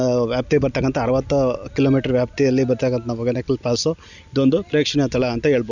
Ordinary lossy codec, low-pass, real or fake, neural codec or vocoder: none; 7.2 kHz; real; none